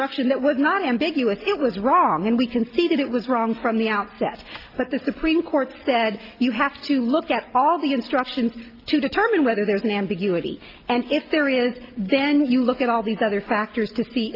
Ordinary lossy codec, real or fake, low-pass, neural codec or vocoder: Opus, 24 kbps; real; 5.4 kHz; none